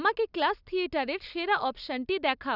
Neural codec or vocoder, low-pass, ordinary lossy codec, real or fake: none; 5.4 kHz; none; real